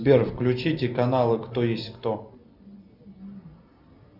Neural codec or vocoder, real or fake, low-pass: none; real; 5.4 kHz